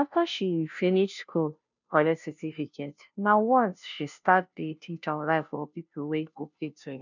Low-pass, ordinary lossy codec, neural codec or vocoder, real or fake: 7.2 kHz; none; codec, 16 kHz, 0.5 kbps, FunCodec, trained on Chinese and English, 25 frames a second; fake